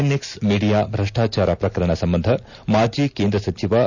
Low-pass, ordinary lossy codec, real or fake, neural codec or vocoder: 7.2 kHz; none; real; none